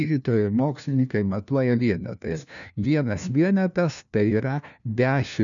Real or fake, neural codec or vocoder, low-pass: fake; codec, 16 kHz, 1 kbps, FunCodec, trained on LibriTTS, 50 frames a second; 7.2 kHz